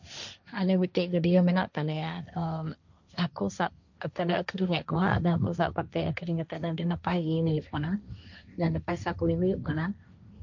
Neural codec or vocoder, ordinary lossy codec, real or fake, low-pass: codec, 16 kHz, 1.1 kbps, Voila-Tokenizer; none; fake; 7.2 kHz